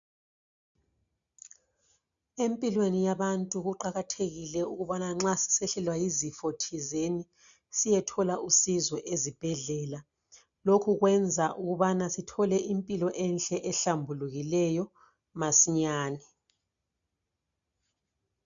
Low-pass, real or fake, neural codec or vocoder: 7.2 kHz; real; none